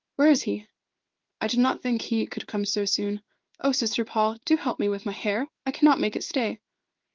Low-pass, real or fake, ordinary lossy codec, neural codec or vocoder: 7.2 kHz; fake; Opus, 32 kbps; codec, 16 kHz in and 24 kHz out, 1 kbps, XY-Tokenizer